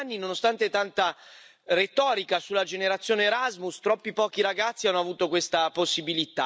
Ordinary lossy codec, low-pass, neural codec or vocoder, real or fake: none; none; none; real